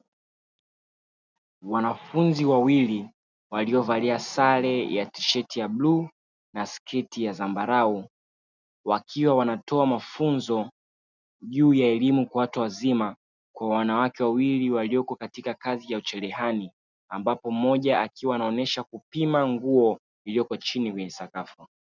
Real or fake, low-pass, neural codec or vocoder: real; 7.2 kHz; none